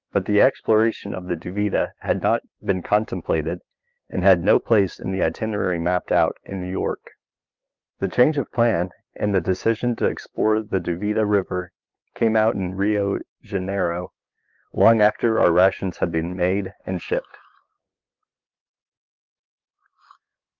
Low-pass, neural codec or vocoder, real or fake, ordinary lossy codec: 7.2 kHz; codec, 16 kHz, 6 kbps, DAC; fake; Opus, 24 kbps